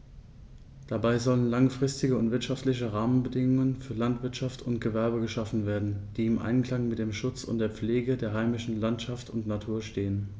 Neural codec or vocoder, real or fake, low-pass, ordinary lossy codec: none; real; none; none